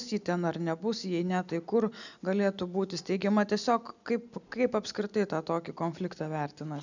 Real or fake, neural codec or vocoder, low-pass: real; none; 7.2 kHz